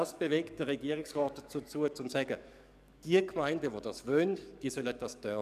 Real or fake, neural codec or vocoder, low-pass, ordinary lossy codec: fake; codec, 44.1 kHz, 7.8 kbps, DAC; 14.4 kHz; none